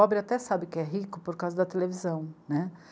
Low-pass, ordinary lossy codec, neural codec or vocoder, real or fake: none; none; none; real